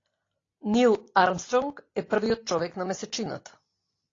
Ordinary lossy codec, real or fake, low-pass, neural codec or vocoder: AAC, 32 kbps; real; 7.2 kHz; none